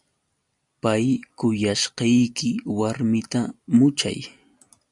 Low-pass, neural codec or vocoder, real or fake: 10.8 kHz; none; real